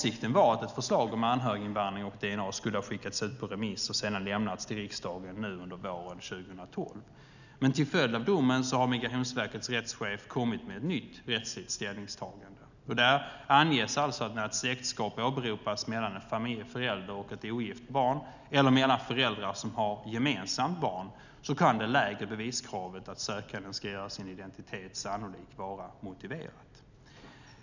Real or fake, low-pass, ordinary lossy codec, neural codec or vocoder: real; 7.2 kHz; none; none